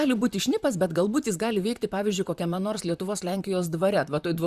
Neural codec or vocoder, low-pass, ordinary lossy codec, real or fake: vocoder, 44.1 kHz, 128 mel bands, Pupu-Vocoder; 14.4 kHz; Opus, 64 kbps; fake